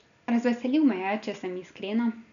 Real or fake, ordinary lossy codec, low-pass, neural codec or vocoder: real; none; 7.2 kHz; none